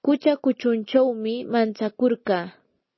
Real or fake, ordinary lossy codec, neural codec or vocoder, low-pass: real; MP3, 24 kbps; none; 7.2 kHz